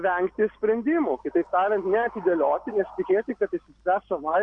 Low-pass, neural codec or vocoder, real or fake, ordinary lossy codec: 9.9 kHz; none; real; Opus, 64 kbps